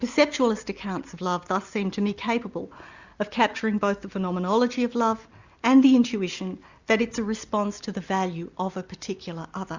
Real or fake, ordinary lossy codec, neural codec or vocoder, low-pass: real; Opus, 64 kbps; none; 7.2 kHz